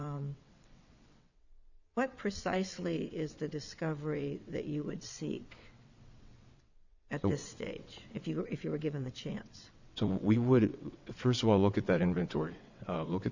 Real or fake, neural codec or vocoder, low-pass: fake; vocoder, 22.05 kHz, 80 mel bands, WaveNeXt; 7.2 kHz